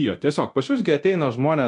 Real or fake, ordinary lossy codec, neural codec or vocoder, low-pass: fake; AAC, 64 kbps; codec, 24 kHz, 0.9 kbps, DualCodec; 10.8 kHz